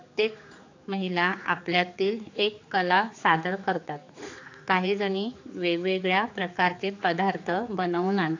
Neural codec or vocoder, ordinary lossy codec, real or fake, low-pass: codec, 16 kHz, 4 kbps, X-Codec, HuBERT features, trained on general audio; AAC, 48 kbps; fake; 7.2 kHz